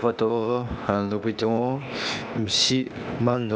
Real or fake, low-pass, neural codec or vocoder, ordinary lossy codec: fake; none; codec, 16 kHz, 0.8 kbps, ZipCodec; none